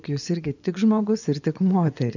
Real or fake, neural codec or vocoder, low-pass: fake; vocoder, 24 kHz, 100 mel bands, Vocos; 7.2 kHz